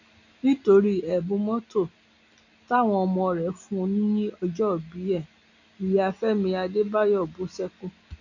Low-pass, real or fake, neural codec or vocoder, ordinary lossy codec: 7.2 kHz; real; none; none